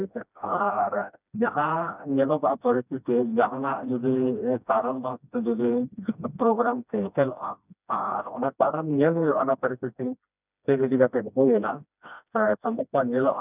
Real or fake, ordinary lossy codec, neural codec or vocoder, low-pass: fake; none; codec, 16 kHz, 1 kbps, FreqCodec, smaller model; 3.6 kHz